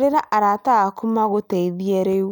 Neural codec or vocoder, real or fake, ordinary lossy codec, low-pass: none; real; none; none